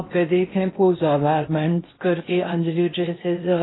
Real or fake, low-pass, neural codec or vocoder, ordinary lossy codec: fake; 7.2 kHz; codec, 16 kHz in and 24 kHz out, 0.6 kbps, FocalCodec, streaming, 2048 codes; AAC, 16 kbps